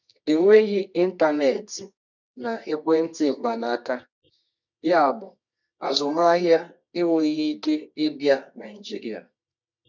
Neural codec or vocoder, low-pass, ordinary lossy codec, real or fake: codec, 24 kHz, 0.9 kbps, WavTokenizer, medium music audio release; 7.2 kHz; none; fake